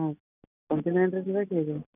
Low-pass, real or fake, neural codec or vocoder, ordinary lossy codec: 3.6 kHz; real; none; none